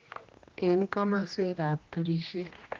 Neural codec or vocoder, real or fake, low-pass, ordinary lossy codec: codec, 16 kHz, 1 kbps, X-Codec, HuBERT features, trained on general audio; fake; 7.2 kHz; Opus, 24 kbps